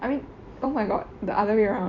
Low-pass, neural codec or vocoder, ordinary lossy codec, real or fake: 7.2 kHz; vocoder, 44.1 kHz, 128 mel bands every 256 samples, BigVGAN v2; none; fake